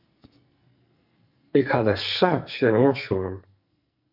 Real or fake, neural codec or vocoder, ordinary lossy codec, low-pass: fake; codec, 44.1 kHz, 2.6 kbps, SNAC; AAC, 48 kbps; 5.4 kHz